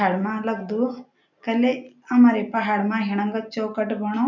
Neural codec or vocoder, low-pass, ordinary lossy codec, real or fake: none; 7.2 kHz; none; real